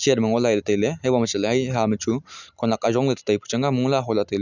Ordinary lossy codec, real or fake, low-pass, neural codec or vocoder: none; real; 7.2 kHz; none